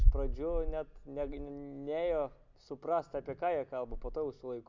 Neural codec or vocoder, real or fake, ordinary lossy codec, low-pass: none; real; MP3, 64 kbps; 7.2 kHz